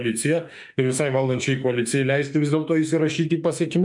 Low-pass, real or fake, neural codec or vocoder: 10.8 kHz; fake; autoencoder, 48 kHz, 32 numbers a frame, DAC-VAE, trained on Japanese speech